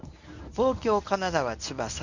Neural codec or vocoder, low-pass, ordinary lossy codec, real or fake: codec, 24 kHz, 0.9 kbps, WavTokenizer, medium speech release version 1; 7.2 kHz; none; fake